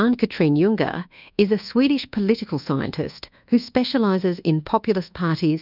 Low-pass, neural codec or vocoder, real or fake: 5.4 kHz; codec, 24 kHz, 1.2 kbps, DualCodec; fake